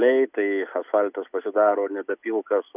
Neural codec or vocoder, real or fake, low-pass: none; real; 3.6 kHz